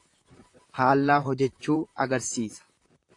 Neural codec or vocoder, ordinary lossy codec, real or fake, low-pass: vocoder, 44.1 kHz, 128 mel bands, Pupu-Vocoder; AAC, 48 kbps; fake; 10.8 kHz